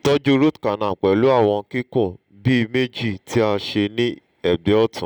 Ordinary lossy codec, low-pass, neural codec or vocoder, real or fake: none; 19.8 kHz; none; real